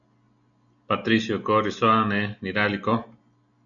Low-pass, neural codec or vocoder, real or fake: 7.2 kHz; none; real